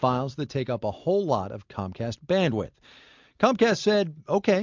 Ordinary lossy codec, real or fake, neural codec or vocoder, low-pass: MP3, 64 kbps; real; none; 7.2 kHz